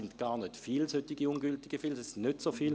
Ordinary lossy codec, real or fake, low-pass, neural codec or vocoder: none; real; none; none